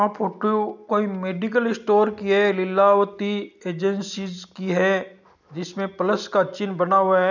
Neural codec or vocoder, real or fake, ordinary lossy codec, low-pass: none; real; none; 7.2 kHz